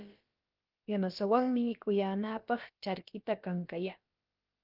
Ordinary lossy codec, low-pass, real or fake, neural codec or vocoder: Opus, 32 kbps; 5.4 kHz; fake; codec, 16 kHz, about 1 kbps, DyCAST, with the encoder's durations